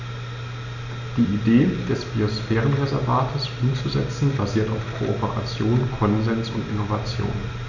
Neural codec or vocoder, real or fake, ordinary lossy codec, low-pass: none; real; none; 7.2 kHz